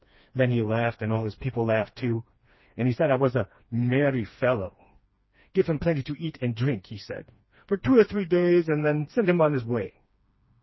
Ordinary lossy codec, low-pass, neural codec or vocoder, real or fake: MP3, 24 kbps; 7.2 kHz; codec, 16 kHz, 2 kbps, FreqCodec, smaller model; fake